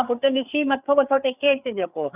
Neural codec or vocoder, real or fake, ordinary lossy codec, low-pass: codec, 16 kHz, 4 kbps, FreqCodec, larger model; fake; none; 3.6 kHz